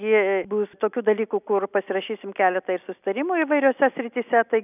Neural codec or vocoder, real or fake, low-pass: none; real; 3.6 kHz